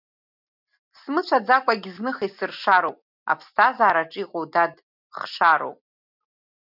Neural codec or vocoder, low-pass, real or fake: none; 5.4 kHz; real